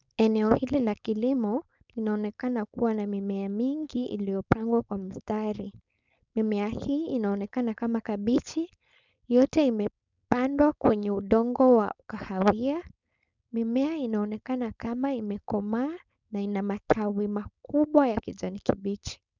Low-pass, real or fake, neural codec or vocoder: 7.2 kHz; fake; codec, 16 kHz, 4.8 kbps, FACodec